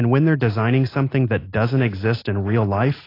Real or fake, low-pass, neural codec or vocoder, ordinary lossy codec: real; 5.4 kHz; none; AAC, 24 kbps